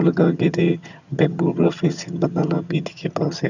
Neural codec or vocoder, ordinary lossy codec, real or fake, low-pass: vocoder, 22.05 kHz, 80 mel bands, HiFi-GAN; none; fake; 7.2 kHz